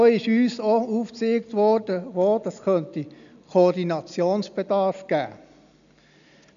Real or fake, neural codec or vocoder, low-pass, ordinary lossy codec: real; none; 7.2 kHz; AAC, 96 kbps